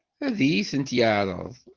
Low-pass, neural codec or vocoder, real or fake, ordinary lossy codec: 7.2 kHz; none; real; Opus, 16 kbps